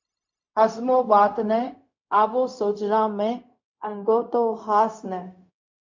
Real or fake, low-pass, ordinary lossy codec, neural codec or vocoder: fake; 7.2 kHz; MP3, 48 kbps; codec, 16 kHz, 0.4 kbps, LongCat-Audio-Codec